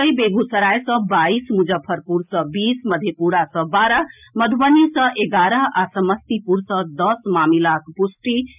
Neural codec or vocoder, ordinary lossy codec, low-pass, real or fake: none; none; 3.6 kHz; real